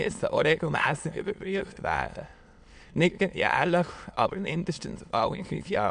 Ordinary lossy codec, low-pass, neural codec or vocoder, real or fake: MP3, 64 kbps; 9.9 kHz; autoencoder, 22.05 kHz, a latent of 192 numbers a frame, VITS, trained on many speakers; fake